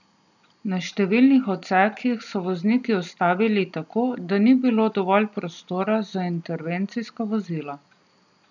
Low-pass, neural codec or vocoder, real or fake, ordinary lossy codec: 7.2 kHz; none; real; none